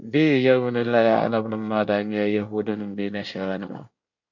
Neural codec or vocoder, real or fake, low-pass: codec, 24 kHz, 1 kbps, SNAC; fake; 7.2 kHz